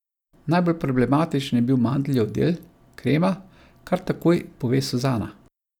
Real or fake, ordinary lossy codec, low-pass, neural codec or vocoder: real; none; 19.8 kHz; none